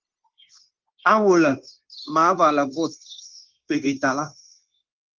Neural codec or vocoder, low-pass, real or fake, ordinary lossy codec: codec, 16 kHz, 0.9 kbps, LongCat-Audio-Codec; 7.2 kHz; fake; Opus, 32 kbps